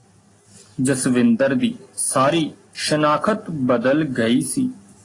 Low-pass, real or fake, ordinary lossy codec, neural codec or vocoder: 10.8 kHz; real; AAC, 32 kbps; none